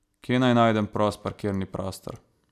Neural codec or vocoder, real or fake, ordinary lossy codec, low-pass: none; real; none; 14.4 kHz